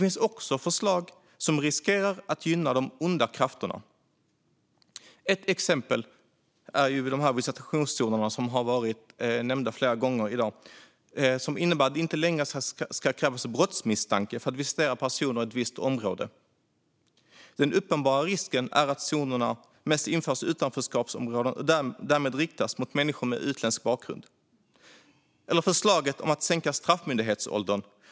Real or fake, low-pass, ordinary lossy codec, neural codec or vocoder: real; none; none; none